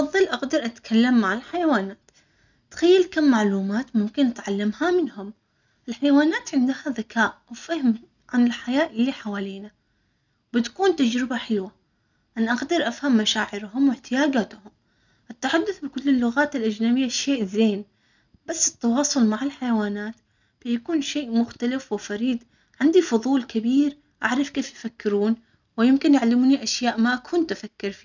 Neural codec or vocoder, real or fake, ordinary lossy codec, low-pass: none; real; none; 7.2 kHz